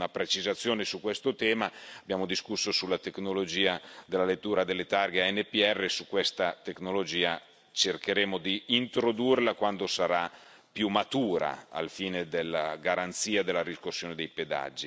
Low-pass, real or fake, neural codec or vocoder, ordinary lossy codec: none; real; none; none